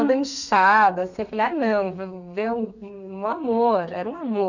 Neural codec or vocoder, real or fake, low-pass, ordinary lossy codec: codec, 32 kHz, 1.9 kbps, SNAC; fake; 7.2 kHz; none